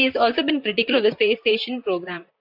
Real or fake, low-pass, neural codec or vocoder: fake; 5.4 kHz; vocoder, 44.1 kHz, 128 mel bands, Pupu-Vocoder